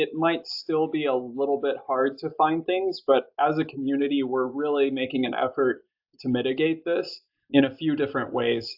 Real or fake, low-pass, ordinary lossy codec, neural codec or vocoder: real; 5.4 kHz; AAC, 48 kbps; none